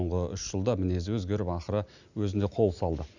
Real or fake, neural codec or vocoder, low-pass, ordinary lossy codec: real; none; 7.2 kHz; none